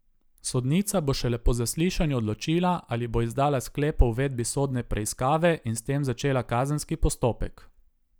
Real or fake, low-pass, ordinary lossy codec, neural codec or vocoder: real; none; none; none